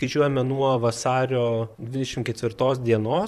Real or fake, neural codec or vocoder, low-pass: fake; vocoder, 44.1 kHz, 128 mel bands, Pupu-Vocoder; 14.4 kHz